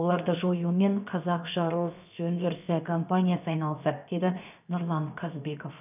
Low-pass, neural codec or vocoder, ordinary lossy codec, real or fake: 3.6 kHz; codec, 16 kHz, 0.7 kbps, FocalCodec; none; fake